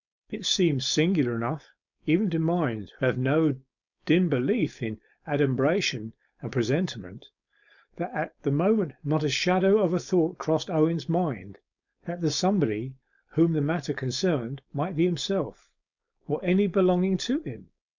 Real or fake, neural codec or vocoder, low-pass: fake; codec, 16 kHz, 4.8 kbps, FACodec; 7.2 kHz